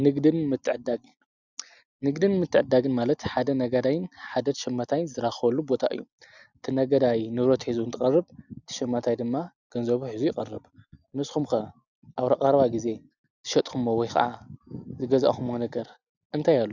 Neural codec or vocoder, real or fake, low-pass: none; real; 7.2 kHz